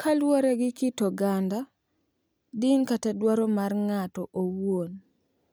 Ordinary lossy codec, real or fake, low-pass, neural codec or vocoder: none; real; none; none